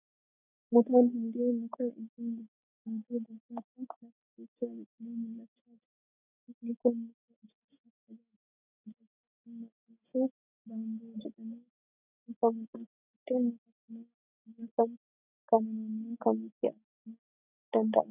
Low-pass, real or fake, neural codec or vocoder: 3.6 kHz; real; none